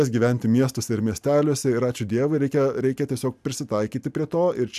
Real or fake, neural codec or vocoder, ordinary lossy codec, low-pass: real; none; AAC, 96 kbps; 14.4 kHz